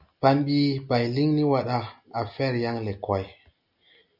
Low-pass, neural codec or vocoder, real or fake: 5.4 kHz; none; real